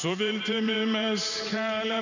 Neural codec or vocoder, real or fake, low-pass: vocoder, 44.1 kHz, 128 mel bands every 512 samples, BigVGAN v2; fake; 7.2 kHz